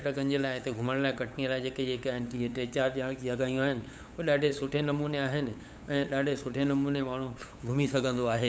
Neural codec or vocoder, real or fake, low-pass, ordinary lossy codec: codec, 16 kHz, 8 kbps, FunCodec, trained on LibriTTS, 25 frames a second; fake; none; none